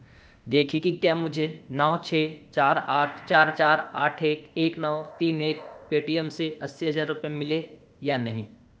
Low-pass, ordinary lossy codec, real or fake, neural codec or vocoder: none; none; fake; codec, 16 kHz, 0.8 kbps, ZipCodec